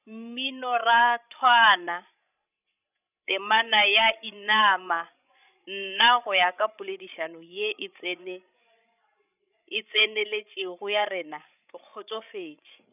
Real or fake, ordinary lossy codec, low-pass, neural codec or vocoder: fake; none; 3.6 kHz; codec, 16 kHz, 16 kbps, FreqCodec, larger model